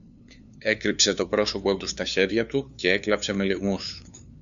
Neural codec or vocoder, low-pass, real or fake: codec, 16 kHz, 2 kbps, FunCodec, trained on LibriTTS, 25 frames a second; 7.2 kHz; fake